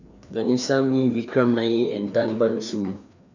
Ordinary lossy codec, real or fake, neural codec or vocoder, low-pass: none; fake; codec, 16 kHz, 2 kbps, FreqCodec, larger model; 7.2 kHz